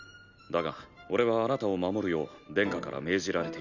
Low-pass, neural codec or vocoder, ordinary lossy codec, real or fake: 7.2 kHz; none; none; real